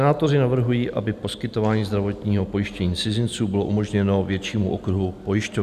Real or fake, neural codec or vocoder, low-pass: real; none; 14.4 kHz